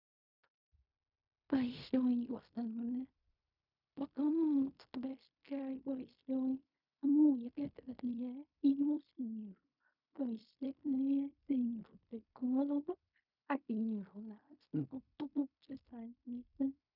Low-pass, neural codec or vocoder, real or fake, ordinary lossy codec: 5.4 kHz; codec, 16 kHz in and 24 kHz out, 0.4 kbps, LongCat-Audio-Codec, fine tuned four codebook decoder; fake; none